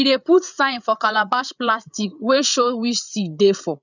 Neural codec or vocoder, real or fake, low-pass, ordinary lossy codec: codec, 16 kHz, 8 kbps, FreqCodec, larger model; fake; 7.2 kHz; none